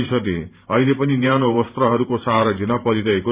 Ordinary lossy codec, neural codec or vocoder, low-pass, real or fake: Opus, 64 kbps; vocoder, 44.1 kHz, 128 mel bands every 512 samples, BigVGAN v2; 3.6 kHz; fake